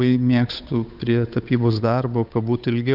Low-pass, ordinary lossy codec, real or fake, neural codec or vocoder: 5.4 kHz; Opus, 64 kbps; fake; codec, 16 kHz, 8 kbps, FunCodec, trained on Chinese and English, 25 frames a second